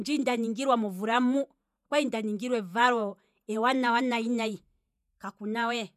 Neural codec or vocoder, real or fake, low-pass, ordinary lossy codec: vocoder, 48 kHz, 128 mel bands, Vocos; fake; 14.4 kHz; none